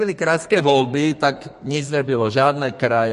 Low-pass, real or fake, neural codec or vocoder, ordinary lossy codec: 14.4 kHz; fake; codec, 32 kHz, 1.9 kbps, SNAC; MP3, 48 kbps